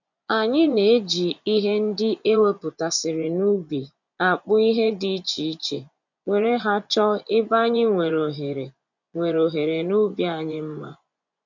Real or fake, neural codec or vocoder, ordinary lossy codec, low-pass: fake; vocoder, 24 kHz, 100 mel bands, Vocos; none; 7.2 kHz